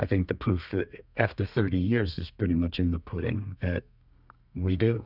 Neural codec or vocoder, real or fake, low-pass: codec, 32 kHz, 1.9 kbps, SNAC; fake; 5.4 kHz